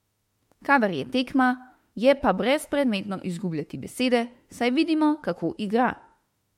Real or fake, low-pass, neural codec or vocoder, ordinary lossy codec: fake; 19.8 kHz; autoencoder, 48 kHz, 32 numbers a frame, DAC-VAE, trained on Japanese speech; MP3, 64 kbps